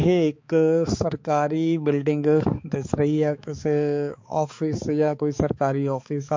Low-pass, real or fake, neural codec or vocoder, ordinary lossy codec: 7.2 kHz; fake; codec, 16 kHz, 4 kbps, X-Codec, HuBERT features, trained on balanced general audio; MP3, 48 kbps